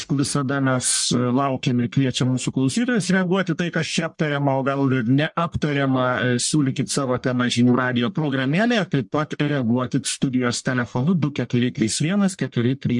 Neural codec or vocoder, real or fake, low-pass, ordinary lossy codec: codec, 44.1 kHz, 1.7 kbps, Pupu-Codec; fake; 10.8 kHz; MP3, 64 kbps